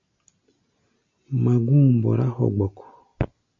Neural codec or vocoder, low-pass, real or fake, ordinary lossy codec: none; 7.2 kHz; real; AAC, 48 kbps